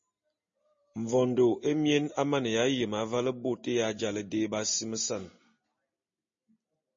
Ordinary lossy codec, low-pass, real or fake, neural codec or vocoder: MP3, 32 kbps; 7.2 kHz; real; none